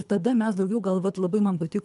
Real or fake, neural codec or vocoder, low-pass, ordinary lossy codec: fake; codec, 24 kHz, 3 kbps, HILCodec; 10.8 kHz; MP3, 96 kbps